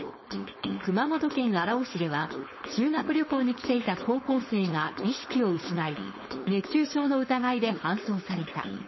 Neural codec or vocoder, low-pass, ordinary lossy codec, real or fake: codec, 16 kHz, 4.8 kbps, FACodec; 7.2 kHz; MP3, 24 kbps; fake